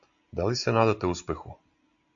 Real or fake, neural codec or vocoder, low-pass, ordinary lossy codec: real; none; 7.2 kHz; AAC, 64 kbps